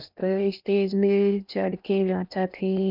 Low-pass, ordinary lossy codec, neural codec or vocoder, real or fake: 5.4 kHz; none; codec, 16 kHz in and 24 kHz out, 0.8 kbps, FocalCodec, streaming, 65536 codes; fake